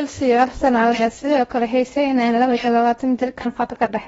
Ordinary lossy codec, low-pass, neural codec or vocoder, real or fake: AAC, 24 kbps; 10.8 kHz; codec, 16 kHz in and 24 kHz out, 0.8 kbps, FocalCodec, streaming, 65536 codes; fake